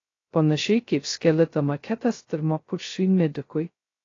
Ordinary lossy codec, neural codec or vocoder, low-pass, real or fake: AAC, 32 kbps; codec, 16 kHz, 0.2 kbps, FocalCodec; 7.2 kHz; fake